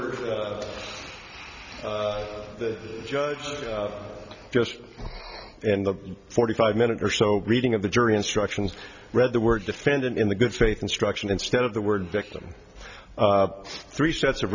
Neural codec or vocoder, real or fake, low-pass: none; real; 7.2 kHz